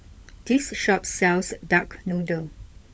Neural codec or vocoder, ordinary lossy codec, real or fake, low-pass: codec, 16 kHz, 16 kbps, FunCodec, trained on LibriTTS, 50 frames a second; none; fake; none